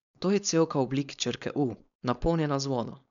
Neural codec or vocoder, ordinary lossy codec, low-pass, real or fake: codec, 16 kHz, 4.8 kbps, FACodec; none; 7.2 kHz; fake